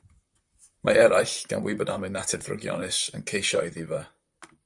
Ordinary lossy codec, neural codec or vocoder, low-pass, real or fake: MP3, 96 kbps; vocoder, 44.1 kHz, 128 mel bands, Pupu-Vocoder; 10.8 kHz; fake